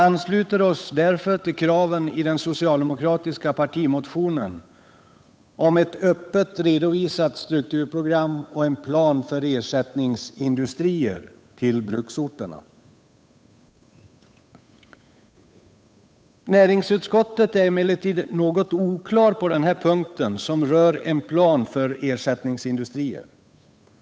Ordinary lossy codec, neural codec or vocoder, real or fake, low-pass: none; codec, 16 kHz, 8 kbps, FunCodec, trained on Chinese and English, 25 frames a second; fake; none